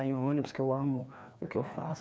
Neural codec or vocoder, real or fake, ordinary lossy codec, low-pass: codec, 16 kHz, 2 kbps, FreqCodec, larger model; fake; none; none